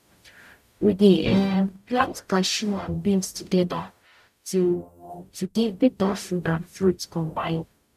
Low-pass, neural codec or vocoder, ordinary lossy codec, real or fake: 14.4 kHz; codec, 44.1 kHz, 0.9 kbps, DAC; none; fake